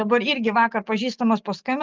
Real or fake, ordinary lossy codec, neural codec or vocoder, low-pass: real; Opus, 32 kbps; none; 7.2 kHz